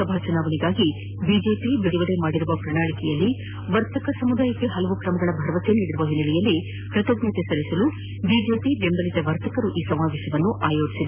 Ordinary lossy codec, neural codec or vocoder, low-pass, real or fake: none; none; 3.6 kHz; real